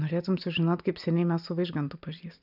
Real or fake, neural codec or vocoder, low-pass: real; none; 5.4 kHz